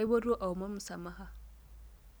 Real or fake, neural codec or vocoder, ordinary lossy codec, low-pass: real; none; none; none